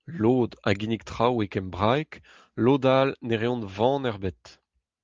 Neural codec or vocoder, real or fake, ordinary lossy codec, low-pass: none; real; Opus, 32 kbps; 7.2 kHz